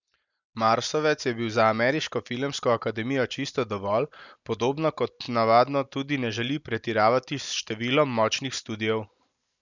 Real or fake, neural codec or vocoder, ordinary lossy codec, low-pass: real; none; none; 7.2 kHz